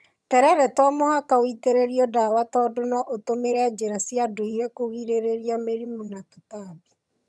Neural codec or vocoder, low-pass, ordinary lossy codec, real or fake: vocoder, 22.05 kHz, 80 mel bands, HiFi-GAN; none; none; fake